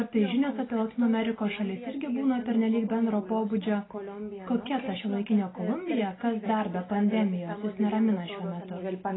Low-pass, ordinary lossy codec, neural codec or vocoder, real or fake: 7.2 kHz; AAC, 16 kbps; none; real